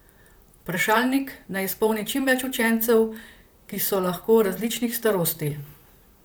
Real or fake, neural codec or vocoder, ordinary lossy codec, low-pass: fake; vocoder, 44.1 kHz, 128 mel bands, Pupu-Vocoder; none; none